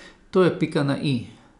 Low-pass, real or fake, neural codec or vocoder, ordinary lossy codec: 10.8 kHz; real; none; none